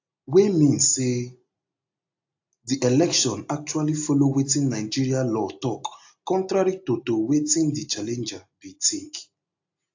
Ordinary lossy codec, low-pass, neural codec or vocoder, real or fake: AAC, 48 kbps; 7.2 kHz; none; real